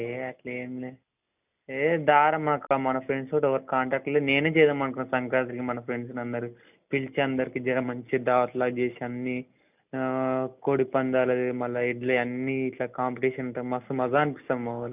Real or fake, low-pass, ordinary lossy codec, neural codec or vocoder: real; 3.6 kHz; none; none